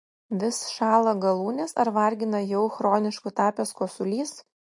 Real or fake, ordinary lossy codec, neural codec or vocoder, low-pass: real; MP3, 48 kbps; none; 10.8 kHz